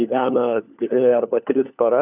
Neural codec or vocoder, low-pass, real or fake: codec, 16 kHz, 4 kbps, FunCodec, trained on LibriTTS, 50 frames a second; 3.6 kHz; fake